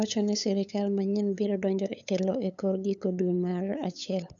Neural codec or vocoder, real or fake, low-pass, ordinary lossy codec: codec, 16 kHz, 8 kbps, FunCodec, trained on LibriTTS, 25 frames a second; fake; 7.2 kHz; none